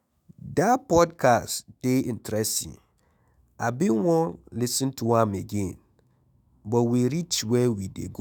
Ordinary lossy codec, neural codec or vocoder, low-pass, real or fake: none; autoencoder, 48 kHz, 128 numbers a frame, DAC-VAE, trained on Japanese speech; none; fake